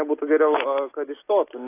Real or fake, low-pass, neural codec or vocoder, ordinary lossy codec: real; 3.6 kHz; none; AAC, 24 kbps